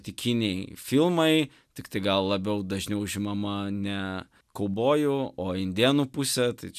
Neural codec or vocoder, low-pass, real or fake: none; 14.4 kHz; real